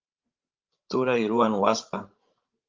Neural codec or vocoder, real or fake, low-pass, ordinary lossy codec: codec, 16 kHz, 16 kbps, FreqCodec, larger model; fake; 7.2 kHz; Opus, 32 kbps